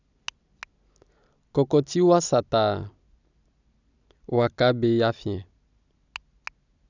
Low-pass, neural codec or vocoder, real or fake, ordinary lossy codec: 7.2 kHz; none; real; none